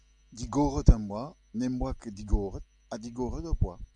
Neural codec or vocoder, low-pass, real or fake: none; 10.8 kHz; real